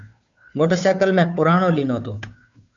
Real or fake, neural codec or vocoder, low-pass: fake; codec, 16 kHz, 2 kbps, FunCodec, trained on Chinese and English, 25 frames a second; 7.2 kHz